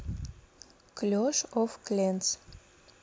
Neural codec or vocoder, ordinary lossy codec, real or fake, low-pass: none; none; real; none